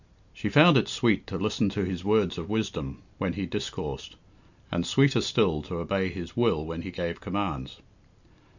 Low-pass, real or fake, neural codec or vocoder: 7.2 kHz; real; none